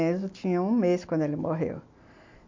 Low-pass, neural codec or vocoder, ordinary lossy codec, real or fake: 7.2 kHz; none; MP3, 48 kbps; real